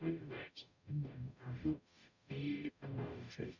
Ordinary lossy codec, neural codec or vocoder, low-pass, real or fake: none; codec, 44.1 kHz, 0.9 kbps, DAC; 7.2 kHz; fake